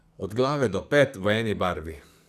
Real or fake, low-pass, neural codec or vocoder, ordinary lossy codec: fake; 14.4 kHz; codec, 44.1 kHz, 2.6 kbps, SNAC; none